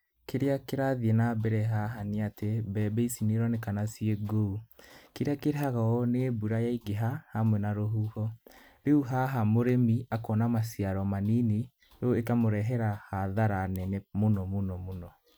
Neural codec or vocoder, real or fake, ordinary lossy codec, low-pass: none; real; none; none